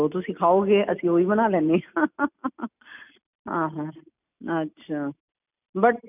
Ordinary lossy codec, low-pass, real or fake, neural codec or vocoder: none; 3.6 kHz; real; none